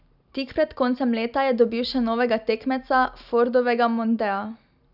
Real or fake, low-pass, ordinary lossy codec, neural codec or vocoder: real; 5.4 kHz; none; none